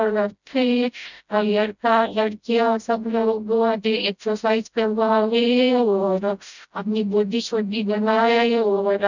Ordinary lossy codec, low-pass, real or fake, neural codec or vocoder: none; 7.2 kHz; fake; codec, 16 kHz, 0.5 kbps, FreqCodec, smaller model